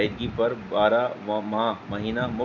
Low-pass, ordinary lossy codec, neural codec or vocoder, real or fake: 7.2 kHz; MP3, 64 kbps; none; real